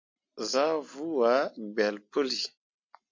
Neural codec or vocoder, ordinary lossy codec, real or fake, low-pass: none; MP3, 48 kbps; real; 7.2 kHz